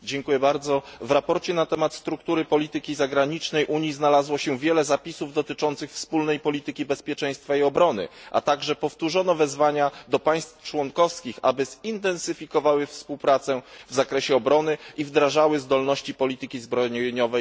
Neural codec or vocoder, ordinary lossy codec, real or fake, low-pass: none; none; real; none